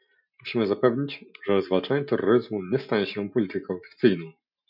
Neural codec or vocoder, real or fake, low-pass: none; real; 5.4 kHz